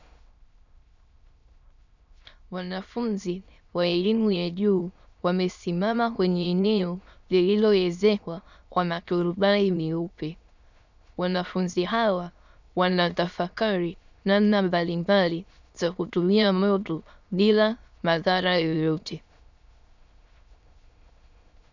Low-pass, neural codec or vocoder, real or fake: 7.2 kHz; autoencoder, 22.05 kHz, a latent of 192 numbers a frame, VITS, trained on many speakers; fake